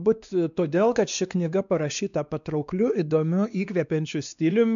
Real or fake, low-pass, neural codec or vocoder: fake; 7.2 kHz; codec, 16 kHz, 2 kbps, X-Codec, WavLM features, trained on Multilingual LibriSpeech